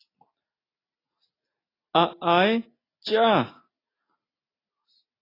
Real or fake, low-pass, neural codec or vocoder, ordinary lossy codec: real; 5.4 kHz; none; AAC, 24 kbps